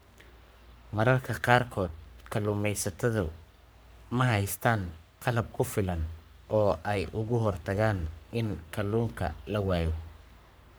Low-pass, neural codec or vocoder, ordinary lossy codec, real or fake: none; codec, 44.1 kHz, 3.4 kbps, Pupu-Codec; none; fake